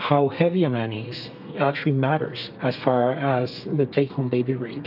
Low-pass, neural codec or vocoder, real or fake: 5.4 kHz; codec, 32 kHz, 1.9 kbps, SNAC; fake